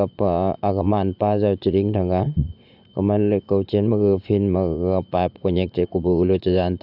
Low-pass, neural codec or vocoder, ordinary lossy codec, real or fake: 5.4 kHz; vocoder, 44.1 kHz, 80 mel bands, Vocos; AAC, 48 kbps; fake